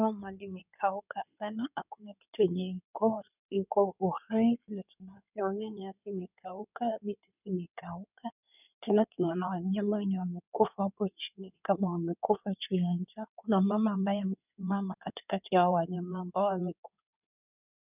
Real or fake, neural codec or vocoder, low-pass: fake; codec, 16 kHz in and 24 kHz out, 2.2 kbps, FireRedTTS-2 codec; 3.6 kHz